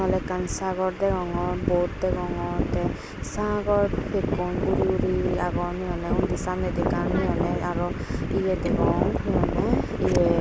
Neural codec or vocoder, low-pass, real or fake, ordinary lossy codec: none; none; real; none